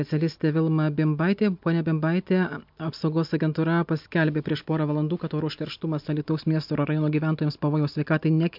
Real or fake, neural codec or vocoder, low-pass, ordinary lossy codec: real; none; 5.4 kHz; MP3, 48 kbps